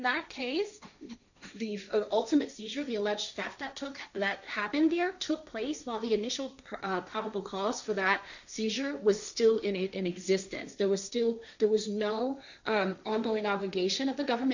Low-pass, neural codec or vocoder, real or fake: 7.2 kHz; codec, 16 kHz, 1.1 kbps, Voila-Tokenizer; fake